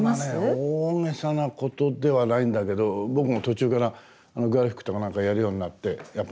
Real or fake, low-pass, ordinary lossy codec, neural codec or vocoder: real; none; none; none